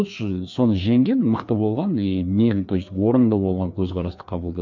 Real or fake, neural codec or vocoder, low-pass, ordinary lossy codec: fake; codec, 16 kHz, 2 kbps, FreqCodec, larger model; 7.2 kHz; MP3, 64 kbps